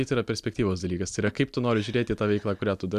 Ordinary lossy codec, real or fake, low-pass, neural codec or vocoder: MP3, 96 kbps; real; 14.4 kHz; none